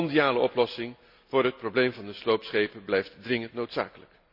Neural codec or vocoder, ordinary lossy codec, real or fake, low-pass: none; none; real; 5.4 kHz